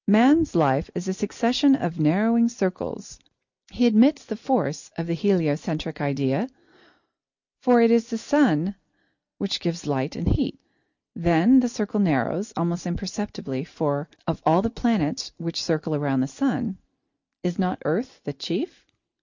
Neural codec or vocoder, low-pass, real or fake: none; 7.2 kHz; real